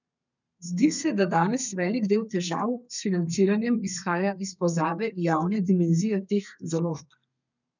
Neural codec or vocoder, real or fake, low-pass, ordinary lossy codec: codec, 32 kHz, 1.9 kbps, SNAC; fake; 7.2 kHz; none